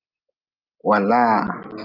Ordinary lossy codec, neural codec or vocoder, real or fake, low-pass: Opus, 24 kbps; none; real; 5.4 kHz